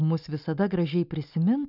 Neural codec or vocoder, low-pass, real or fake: none; 5.4 kHz; real